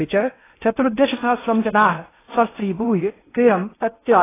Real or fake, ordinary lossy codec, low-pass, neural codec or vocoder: fake; AAC, 16 kbps; 3.6 kHz; codec, 16 kHz in and 24 kHz out, 0.8 kbps, FocalCodec, streaming, 65536 codes